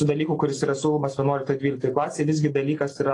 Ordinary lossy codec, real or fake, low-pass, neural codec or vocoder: AAC, 32 kbps; real; 10.8 kHz; none